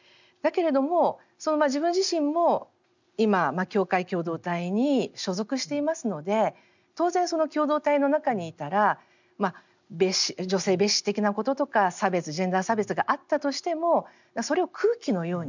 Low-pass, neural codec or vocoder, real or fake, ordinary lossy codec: 7.2 kHz; none; real; none